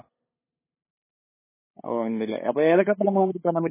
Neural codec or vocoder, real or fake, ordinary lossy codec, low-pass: codec, 16 kHz, 8 kbps, FunCodec, trained on LibriTTS, 25 frames a second; fake; MP3, 16 kbps; 3.6 kHz